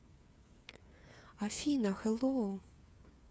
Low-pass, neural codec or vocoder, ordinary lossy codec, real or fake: none; codec, 16 kHz, 8 kbps, FreqCodec, smaller model; none; fake